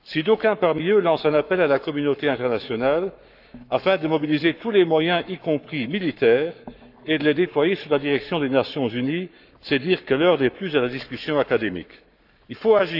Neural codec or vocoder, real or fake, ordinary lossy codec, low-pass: codec, 44.1 kHz, 7.8 kbps, Pupu-Codec; fake; none; 5.4 kHz